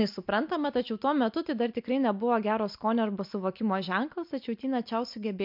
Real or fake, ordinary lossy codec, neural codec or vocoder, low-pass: real; MP3, 48 kbps; none; 5.4 kHz